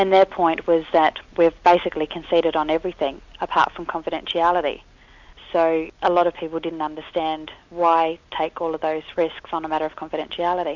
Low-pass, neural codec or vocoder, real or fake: 7.2 kHz; none; real